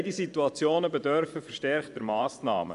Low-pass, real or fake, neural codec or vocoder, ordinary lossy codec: 10.8 kHz; fake; vocoder, 24 kHz, 100 mel bands, Vocos; none